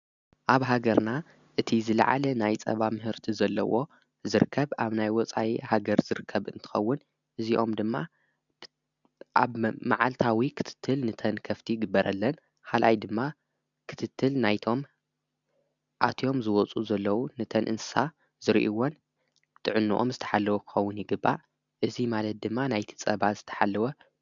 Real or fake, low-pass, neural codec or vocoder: real; 7.2 kHz; none